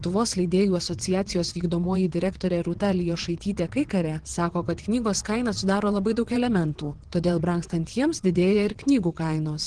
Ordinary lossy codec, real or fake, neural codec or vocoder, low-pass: Opus, 16 kbps; fake; vocoder, 22.05 kHz, 80 mel bands, WaveNeXt; 9.9 kHz